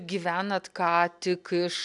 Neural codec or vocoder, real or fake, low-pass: none; real; 10.8 kHz